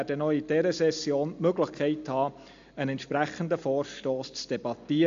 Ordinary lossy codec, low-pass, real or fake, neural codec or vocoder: AAC, 64 kbps; 7.2 kHz; real; none